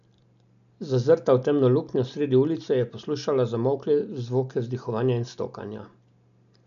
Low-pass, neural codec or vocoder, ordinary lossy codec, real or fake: 7.2 kHz; none; none; real